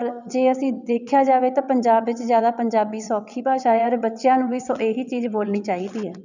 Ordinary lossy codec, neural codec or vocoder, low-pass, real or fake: none; vocoder, 22.05 kHz, 80 mel bands, WaveNeXt; 7.2 kHz; fake